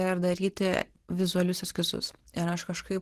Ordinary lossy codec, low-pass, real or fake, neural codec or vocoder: Opus, 16 kbps; 14.4 kHz; real; none